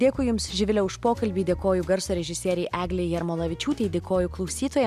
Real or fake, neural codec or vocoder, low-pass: real; none; 14.4 kHz